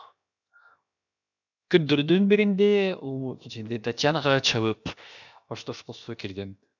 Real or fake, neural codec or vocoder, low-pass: fake; codec, 16 kHz, 0.7 kbps, FocalCodec; 7.2 kHz